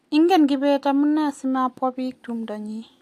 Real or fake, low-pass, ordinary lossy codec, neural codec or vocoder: real; 14.4 kHz; AAC, 64 kbps; none